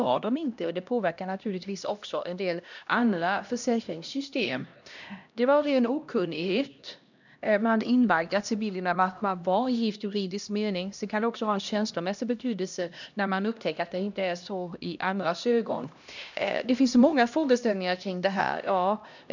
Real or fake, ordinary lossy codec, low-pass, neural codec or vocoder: fake; none; 7.2 kHz; codec, 16 kHz, 1 kbps, X-Codec, HuBERT features, trained on LibriSpeech